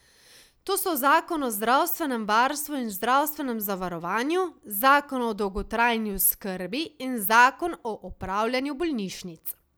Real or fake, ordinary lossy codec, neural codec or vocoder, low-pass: real; none; none; none